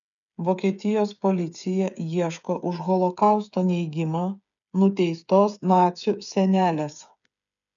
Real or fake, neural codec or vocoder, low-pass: fake; codec, 16 kHz, 8 kbps, FreqCodec, smaller model; 7.2 kHz